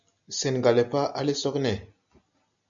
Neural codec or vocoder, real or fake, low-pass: none; real; 7.2 kHz